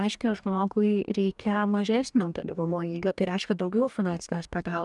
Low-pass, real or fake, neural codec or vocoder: 10.8 kHz; fake; codec, 44.1 kHz, 2.6 kbps, DAC